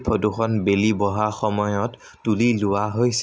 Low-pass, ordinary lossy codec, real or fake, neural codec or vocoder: none; none; real; none